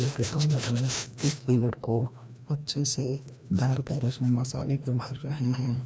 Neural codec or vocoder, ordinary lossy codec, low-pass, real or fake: codec, 16 kHz, 1 kbps, FreqCodec, larger model; none; none; fake